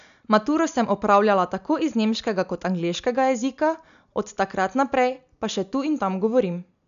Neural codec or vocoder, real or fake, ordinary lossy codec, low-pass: none; real; none; 7.2 kHz